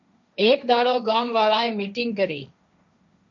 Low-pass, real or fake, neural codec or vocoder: 7.2 kHz; fake; codec, 16 kHz, 1.1 kbps, Voila-Tokenizer